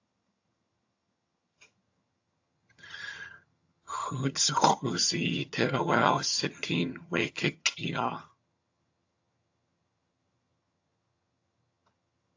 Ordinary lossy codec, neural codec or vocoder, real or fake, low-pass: AAC, 48 kbps; vocoder, 22.05 kHz, 80 mel bands, HiFi-GAN; fake; 7.2 kHz